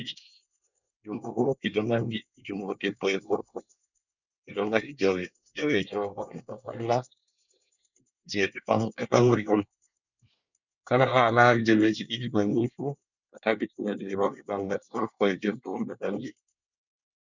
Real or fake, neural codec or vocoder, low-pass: fake; codec, 24 kHz, 1 kbps, SNAC; 7.2 kHz